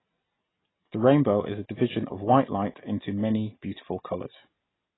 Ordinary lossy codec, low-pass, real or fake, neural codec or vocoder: AAC, 16 kbps; 7.2 kHz; real; none